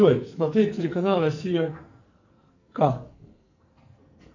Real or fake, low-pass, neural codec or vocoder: fake; 7.2 kHz; codec, 44.1 kHz, 2.6 kbps, SNAC